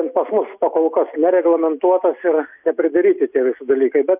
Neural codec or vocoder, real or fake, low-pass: none; real; 3.6 kHz